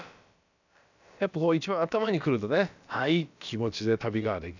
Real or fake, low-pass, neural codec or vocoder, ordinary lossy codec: fake; 7.2 kHz; codec, 16 kHz, about 1 kbps, DyCAST, with the encoder's durations; none